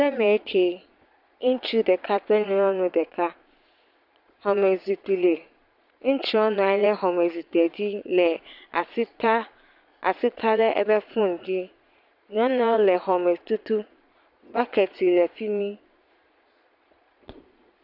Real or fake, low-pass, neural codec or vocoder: fake; 5.4 kHz; vocoder, 22.05 kHz, 80 mel bands, Vocos